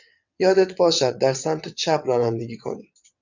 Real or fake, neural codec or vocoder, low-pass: fake; vocoder, 22.05 kHz, 80 mel bands, WaveNeXt; 7.2 kHz